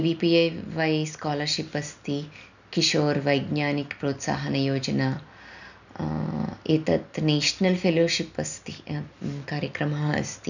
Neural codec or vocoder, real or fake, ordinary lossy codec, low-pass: none; real; none; 7.2 kHz